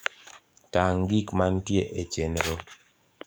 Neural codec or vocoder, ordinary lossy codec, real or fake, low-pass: codec, 44.1 kHz, 7.8 kbps, DAC; none; fake; none